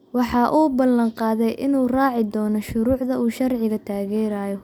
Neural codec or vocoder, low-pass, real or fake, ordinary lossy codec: none; 19.8 kHz; real; none